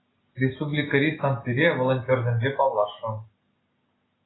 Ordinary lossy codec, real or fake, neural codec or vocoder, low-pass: AAC, 16 kbps; real; none; 7.2 kHz